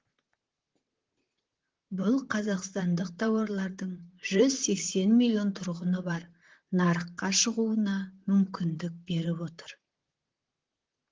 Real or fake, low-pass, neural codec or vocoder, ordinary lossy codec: fake; 7.2 kHz; vocoder, 44.1 kHz, 128 mel bands, Pupu-Vocoder; Opus, 16 kbps